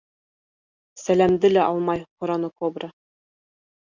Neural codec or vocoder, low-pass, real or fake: none; 7.2 kHz; real